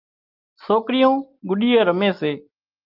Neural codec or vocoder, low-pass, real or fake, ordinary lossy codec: none; 5.4 kHz; real; Opus, 24 kbps